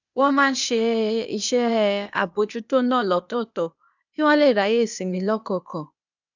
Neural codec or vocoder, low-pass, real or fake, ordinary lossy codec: codec, 16 kHz, 0.8 kbps, ZipCodec; 7.2 kHz; fake; none